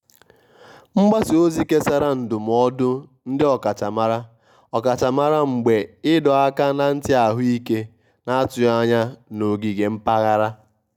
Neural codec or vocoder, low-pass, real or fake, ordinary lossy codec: none; 19.8 kHz; real; none